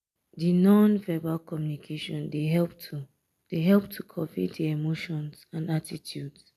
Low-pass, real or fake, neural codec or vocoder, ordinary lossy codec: 14.4 kHz; real; none; none